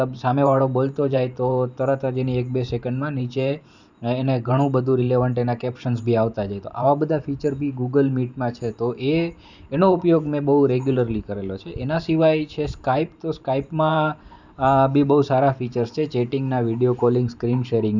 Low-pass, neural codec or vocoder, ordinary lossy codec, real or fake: 7.2 kHz; vocoder, 44.1 kHz, 128 mel bands every 512 samples, BigVGAN v2; none; fake